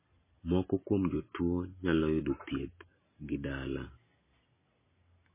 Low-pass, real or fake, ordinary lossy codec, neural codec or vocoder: 3.6 kHz; real; MP3, 16 kbps; none